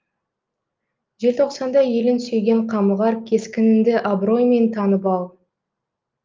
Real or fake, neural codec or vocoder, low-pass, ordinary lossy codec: real; none; 7.2 kHz; Opus, 24 kbps